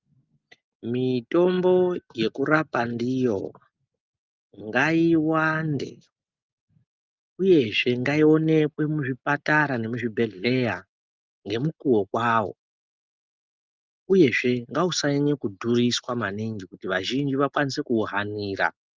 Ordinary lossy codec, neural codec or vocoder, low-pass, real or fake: Opus, 24 kbps; none; 7.2 kHz; real